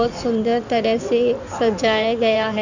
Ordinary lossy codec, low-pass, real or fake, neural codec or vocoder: none; 7.2 kHz; fake; codec, 16 kHz in and 24 kHz out, 2.2 kbps, FireRedTTS-2 codec